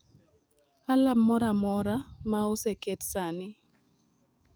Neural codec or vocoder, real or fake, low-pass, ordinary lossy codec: codec, 44.1 kHz, 7.8 kbps, DAC; fake; none; none